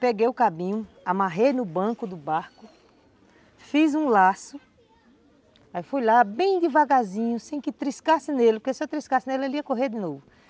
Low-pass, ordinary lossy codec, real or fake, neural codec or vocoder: none; none; real; none